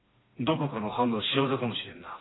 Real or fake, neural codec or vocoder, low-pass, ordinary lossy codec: fake; codec, 16 kHz, 2 kbps, FreqCodec, smaller model; 7.2 kHz; AAC, 16 kbps